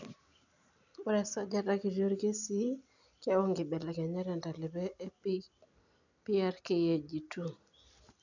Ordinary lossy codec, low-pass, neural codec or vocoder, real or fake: none; 7.2 kHz; none; real